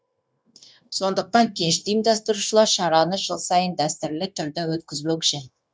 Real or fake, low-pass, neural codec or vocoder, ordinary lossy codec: fake; none; codec, 16 kHz, 0.9 kbps, LongCat-Audio-Codec; none